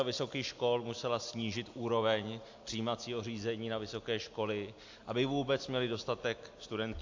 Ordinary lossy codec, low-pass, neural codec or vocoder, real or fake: AAC, 48 kbps; 7.2 kHz; none; real